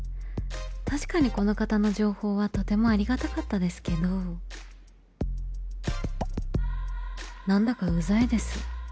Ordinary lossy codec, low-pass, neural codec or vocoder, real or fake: none; none; none; real